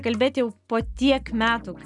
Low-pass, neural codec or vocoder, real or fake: 10.8 kHz; none; real